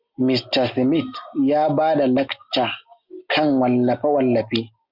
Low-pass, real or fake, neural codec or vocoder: 5.4 kHz; real; none